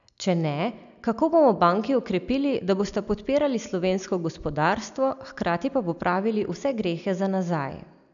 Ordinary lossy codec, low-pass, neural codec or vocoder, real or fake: none; 7.2 kHz; none; real